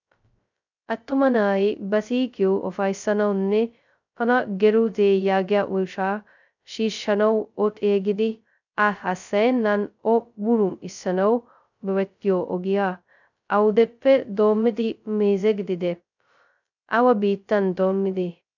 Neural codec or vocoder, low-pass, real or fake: codec, 16 kHz, 0.2 kbps, FocalCodec; 7.2 kHz; fake